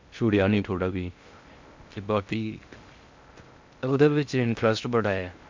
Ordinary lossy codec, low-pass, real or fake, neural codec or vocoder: MP3, 64 kbps; 7.2 kHz; fake; codec, 16 kHz in and 24 kHz out, 0.6 kbps, FocalCodec, streaming, 2048 codes